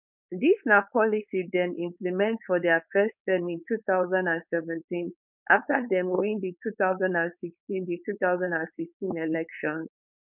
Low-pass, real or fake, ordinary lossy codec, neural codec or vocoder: 3.6 kHz; fake; none; codec, 16 kHz, 4.8 kbps, FACodec